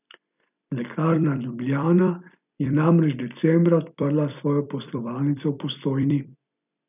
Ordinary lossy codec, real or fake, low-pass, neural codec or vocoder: none; fake; 3.6 kHz; vocoder, 44.1 kHz, 128 mel bands every 256 samples, BigVGAN v2